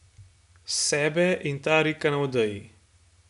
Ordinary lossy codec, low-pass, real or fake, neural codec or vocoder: none; 10.8 kHz; real; none